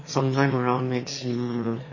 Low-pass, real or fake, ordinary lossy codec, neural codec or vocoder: 7.2 kHz; fake; MP3, 32 kbps; autoencoder, 22.05 kHz, a latent of 192 numbers a frame, VITS, trained on one speaker